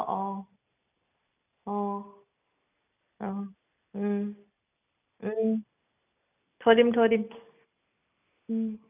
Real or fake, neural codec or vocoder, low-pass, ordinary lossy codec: real; none; 3.6 kHz; none